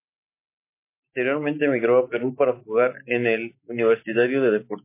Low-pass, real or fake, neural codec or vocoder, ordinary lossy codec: 3.6 kHz; real; none; MP3, 24 kbps